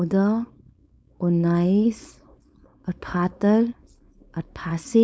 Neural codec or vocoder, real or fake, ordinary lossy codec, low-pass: codec, 16 kHz, 4.8 kbps, FACodec; fake; none; none